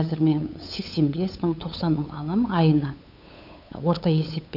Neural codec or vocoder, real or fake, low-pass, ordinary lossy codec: codec, 16 kHz, 8 kbps, FunCodec, trained on LibriTTS, 25 frames a second; fake; 5.4 kHz; none